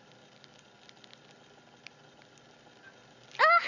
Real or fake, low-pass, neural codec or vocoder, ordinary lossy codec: real; 7.2 kHz; none; none